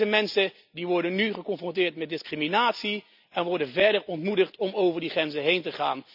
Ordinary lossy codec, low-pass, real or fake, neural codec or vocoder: none; 5.4 kHz; real; none